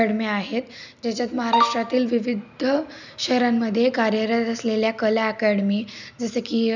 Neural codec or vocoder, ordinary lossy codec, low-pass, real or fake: none; none; 7.2 kHz; real